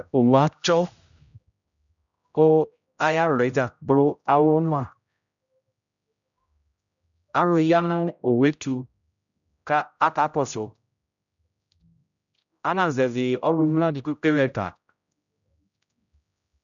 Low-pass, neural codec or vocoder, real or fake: 7.2 kHz; codec, 16 kHz, 0.5 kbps, X-Codec, HuBERT features, trained on general audio; fake